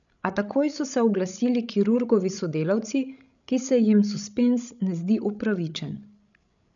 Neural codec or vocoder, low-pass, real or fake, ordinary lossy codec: codec, 16 kHz, 16 kbps, FreqCodec, larger model; 7.2 kHz; fake; none